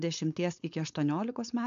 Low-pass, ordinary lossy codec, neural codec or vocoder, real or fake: 7.2 kHz; MP3, 64 kbps; codec, 16 kHz, 4.8 kbps, FACodec; fake